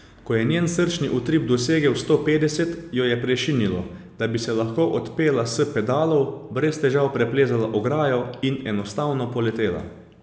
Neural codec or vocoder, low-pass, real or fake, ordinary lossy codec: none; none; real; none